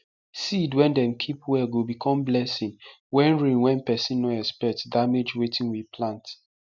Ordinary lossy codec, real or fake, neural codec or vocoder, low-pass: none; real; none; 7.2 kHz